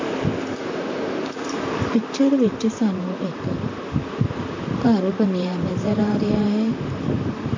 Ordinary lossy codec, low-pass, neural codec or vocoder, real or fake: MP3, 48 kbps; 7.2 kHz; vocoder, 44.1 kHz, 128 mel bands, Pupu-Vocoder; fake